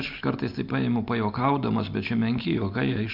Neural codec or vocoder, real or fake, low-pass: none; real; 5.4 kHz